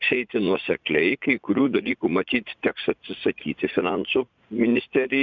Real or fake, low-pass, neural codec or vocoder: fake; 7.2 kHz; vocoder, 44.1 kHz, 80 mel bands, Vocos